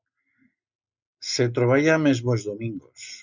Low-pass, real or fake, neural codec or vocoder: 7.2 kHz; real; none